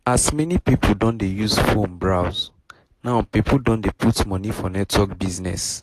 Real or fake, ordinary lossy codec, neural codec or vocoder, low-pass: real; AAC, 48 kbps; none; 14.4 kHz